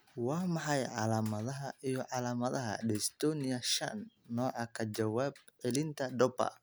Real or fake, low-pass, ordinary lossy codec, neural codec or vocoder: real; none; none; none